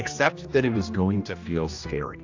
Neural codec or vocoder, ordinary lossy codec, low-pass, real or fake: codec, 16 kHz, 1 kbps, X-Codec, HuBERT features, trained on general audio; AAC, 48 kbps; 7.2 kHz; fake